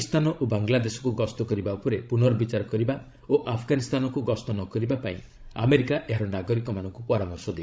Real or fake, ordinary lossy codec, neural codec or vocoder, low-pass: fake; none; codec, 16 kHz, 16 kbps, FreqCodec, larger model; none